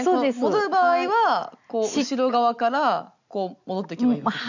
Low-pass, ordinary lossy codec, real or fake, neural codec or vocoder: 7.2 kHz; none; real; none